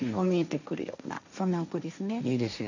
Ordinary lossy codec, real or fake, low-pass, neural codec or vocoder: none; fake; 7.2 kHz; codec, 16 kHz, 1.1 kbps, Voila-Tokenizer